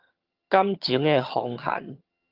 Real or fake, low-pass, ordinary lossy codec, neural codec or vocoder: fake; 5.4 kHz; Opus, 24 kbps; vocoder, 22.05 kHz, 80 mel bands, HiFi-GAN